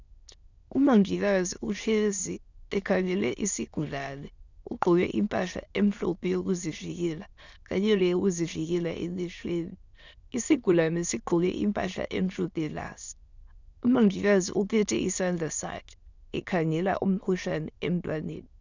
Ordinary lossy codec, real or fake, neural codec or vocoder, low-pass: Opus, 64 kbps; fake; autoencoder, 22.05 kHz, a latent of 192 numbers a frame, VITS, trained on many speakers; 7.2 kHz